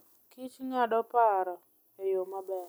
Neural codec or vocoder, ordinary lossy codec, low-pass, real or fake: none; none; none; real